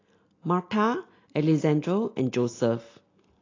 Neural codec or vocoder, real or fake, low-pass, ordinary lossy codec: none; real; 7.2 kHz; AAC, 32 kbps